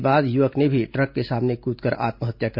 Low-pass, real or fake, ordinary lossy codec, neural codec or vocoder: 5.4 kHz; real; MP3, 48 kbps; none